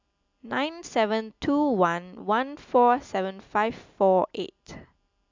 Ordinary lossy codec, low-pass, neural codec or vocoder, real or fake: MP3, 64 kbps; 7.2 kHz; none; real